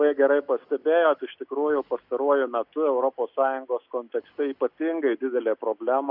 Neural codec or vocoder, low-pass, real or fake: none; 5.4 kHz; real